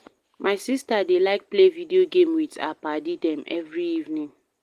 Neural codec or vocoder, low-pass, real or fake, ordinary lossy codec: none; 14.4 kHz; real; Opus, 24 kbps